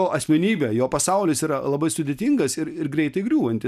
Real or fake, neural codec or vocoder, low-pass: real; none; 14.4 kHz